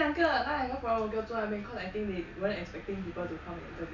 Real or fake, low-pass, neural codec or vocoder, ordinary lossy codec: real; 7.2 kHz; none; none